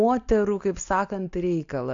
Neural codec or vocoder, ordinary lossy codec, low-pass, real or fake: none; AAC, 48 kbps; 7.2 kHz; real